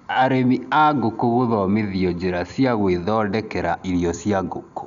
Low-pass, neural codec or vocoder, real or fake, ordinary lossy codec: 7.2 kHz; none; real; none